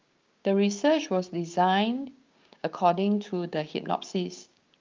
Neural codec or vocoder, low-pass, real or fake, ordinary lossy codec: codec, 16 kHz, 6 kbps, DAC; 7.2 kHz; fake; Opus, 24 kbps